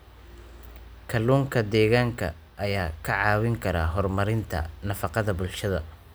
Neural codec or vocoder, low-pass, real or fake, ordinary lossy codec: none; none; real; none